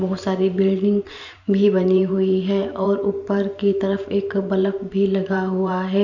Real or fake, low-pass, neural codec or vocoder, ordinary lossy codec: fake; 7.2 kHz; vocoder, 44.1 kHz, 128 mel bands every 512 samples, BigVGAN v2; none